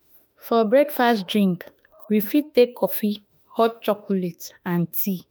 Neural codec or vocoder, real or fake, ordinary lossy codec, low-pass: autoencoder, 48 kHz, 32 numbers a frame, DAC-VAE, trained on Japanese speech; fake; none; none